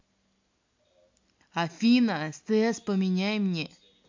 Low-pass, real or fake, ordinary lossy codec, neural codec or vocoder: 7.2 kHz; real; MP3, 48 kbps; none